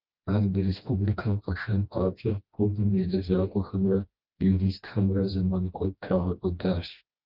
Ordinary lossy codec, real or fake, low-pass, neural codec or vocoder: Opus, 32 kbps; fake; 5.4 kHz; codec, 16 kHz, 1 kbps, FreqCodec, smaller model